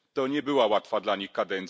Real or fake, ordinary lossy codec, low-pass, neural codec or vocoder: real; none; none; none